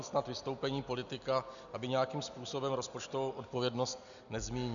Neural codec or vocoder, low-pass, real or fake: none; 7.2 kHz; real